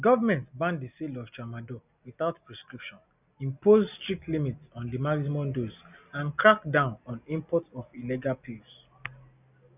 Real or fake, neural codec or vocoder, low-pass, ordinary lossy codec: real; none; 3.6 kHz; none